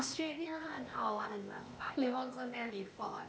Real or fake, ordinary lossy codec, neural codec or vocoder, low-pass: fake; none; codec, 16 kHz, 0.8 kbps, ZipCodec; none